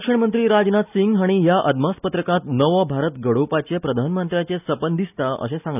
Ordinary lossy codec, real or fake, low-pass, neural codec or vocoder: none; real; 3.6 kHz; none